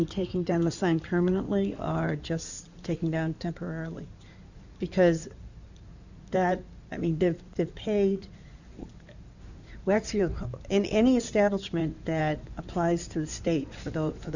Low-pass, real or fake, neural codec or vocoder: 7.2 kHz; fake; codec, 16 kHz in and 24 kHz out, 2.2 kbps, FireRedTTS-2 codec